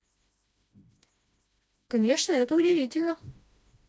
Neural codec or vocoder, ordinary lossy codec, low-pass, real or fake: codec, 16 kHz, 1 kbps, FreqCodec, smaller model; none; none; fake